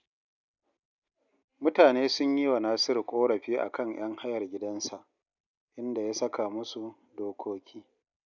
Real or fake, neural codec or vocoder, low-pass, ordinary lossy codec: real; none; 7.2 kHz; none